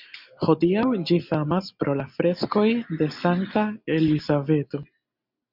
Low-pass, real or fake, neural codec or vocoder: 5.4 kHz; real; none